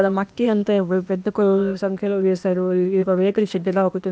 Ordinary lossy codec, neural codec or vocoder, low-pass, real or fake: none; codec, 16 kHz, 0.8 kbps, ZipCodec; none; fake